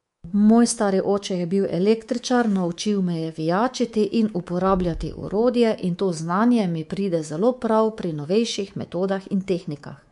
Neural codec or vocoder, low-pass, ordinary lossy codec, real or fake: codec, 24 kHz, 3.1 kbps, DualCodec; 10.8 kHz; MP3, 64 kbps; fake